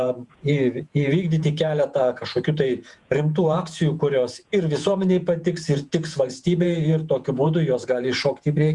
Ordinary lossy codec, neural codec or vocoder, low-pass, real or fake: Opus, 64 kbps; vocoder, 48 kHz, 128 mel bands, Vocos; 10.8 kHz; fake